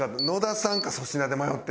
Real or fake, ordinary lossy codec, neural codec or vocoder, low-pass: real; none; none; none